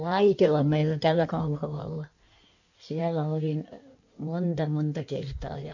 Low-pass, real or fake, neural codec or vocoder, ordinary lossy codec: 7.2 kHz; fake; codec, 16 kHz in and 24 kHz out, 1.1 kbps, FireRedTTS-2 codec; none